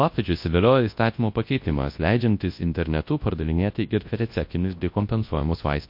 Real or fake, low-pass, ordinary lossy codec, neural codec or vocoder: fake; 5.4 kHz; MP3, 32 kbps; codec, 24 kHz, 0.9 kbps, WavTokenizer, large speech release